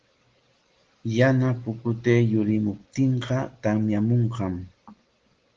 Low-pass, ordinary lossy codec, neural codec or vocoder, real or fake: 7.2 kHz; Opus, 16 kbps; none; real